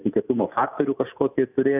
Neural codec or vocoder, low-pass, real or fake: none; 3.6 kHz; real